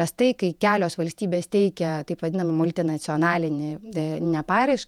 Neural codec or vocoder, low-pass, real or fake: vocoder, 48 kHz, 128 mel bands, Vocos; 19.8 kHz; fake